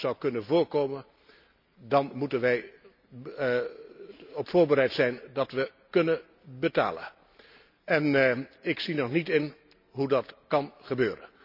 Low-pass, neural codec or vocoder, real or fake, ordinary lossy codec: 5.4 kHz; none; real; none